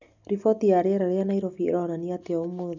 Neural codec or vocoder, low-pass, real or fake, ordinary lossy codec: none; 7.2 kHz; real; none